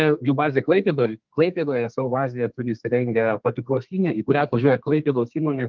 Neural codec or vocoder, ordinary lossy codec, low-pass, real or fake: codec, 32 kHz, 1.9 kbps, SNAC; Opus, 32 kbps; 7.2 kHz; fake